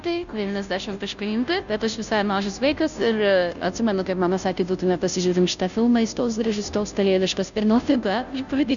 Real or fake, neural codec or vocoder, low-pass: fake; codec, 16 kHz, 0.5 kbps, FunCodec, trained on Chinese and English, 25 frames a second; 7.2 kHz